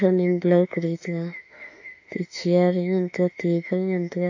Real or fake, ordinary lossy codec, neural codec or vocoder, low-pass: fake; AAC, 48 kbps; autoencoder, 48 kHz, 32 numbers a frame, DAC-VAE, trained on Japanese speech; 7.2 kHz